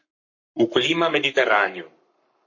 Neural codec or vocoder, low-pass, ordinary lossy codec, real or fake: codec, 44.1 kHz, 7.8 kbps, Pupu-Codec; 7.2 kHz; MP3, 32 kbps; fake